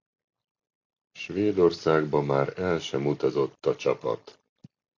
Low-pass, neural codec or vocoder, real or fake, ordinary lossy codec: 7.2 kHz; none; real; MP3, 48 kbps